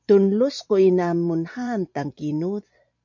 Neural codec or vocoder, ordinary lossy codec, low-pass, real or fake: vocoder, 44.1 kHz, 128 mel bands every 512 samples, BigVGAN v2; MP3, 64 kbps; 7.2 kHz; fake